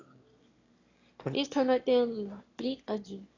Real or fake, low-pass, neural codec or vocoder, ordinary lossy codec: fake; 7.2 kHz; autoencoder, 22.05 kHz, a latent of 192 numbers a frame, VITS, trained on one speaker; AAC, 32 kbps